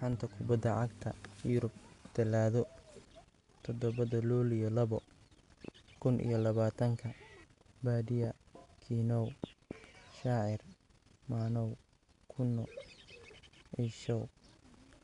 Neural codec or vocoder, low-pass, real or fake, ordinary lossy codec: none; 10.8 kHz; real; none